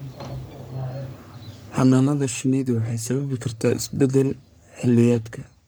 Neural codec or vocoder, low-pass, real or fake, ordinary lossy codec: codec, 44.1 kHz, 3.4 kbps, Pupu-Codec; none; fake; none